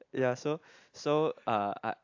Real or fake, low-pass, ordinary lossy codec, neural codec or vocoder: real; 7.2 kHz; AAC, 48 kbps; none